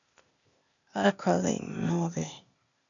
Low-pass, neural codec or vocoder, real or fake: 7.2 kHz; codec, 16 kHz, 0.8 kbps, ZipCodec; fake